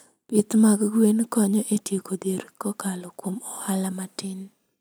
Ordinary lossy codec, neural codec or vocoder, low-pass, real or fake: none; vocoder, 44.1 kHz, 128 mel bands every 256 samples, BigVGAN v2; none; fake